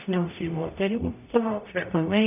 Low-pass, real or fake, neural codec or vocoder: 3.6 kHz; fake; codec, 44.1 kHz, 0.9 kbps, DAC